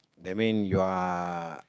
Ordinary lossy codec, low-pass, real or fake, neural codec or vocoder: none; none; fake; codec, 16 kHz, 6 kbps, DAC